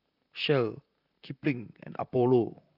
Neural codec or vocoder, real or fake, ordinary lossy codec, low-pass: vocoder, 44.1 kHz, 128 mel bands, Pupu-Vocoder; fake; none; 5.4 kHz